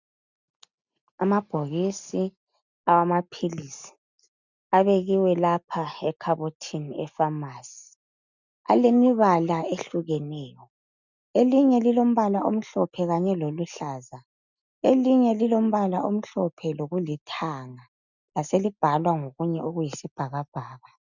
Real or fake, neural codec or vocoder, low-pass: real; none; 7.2 kHz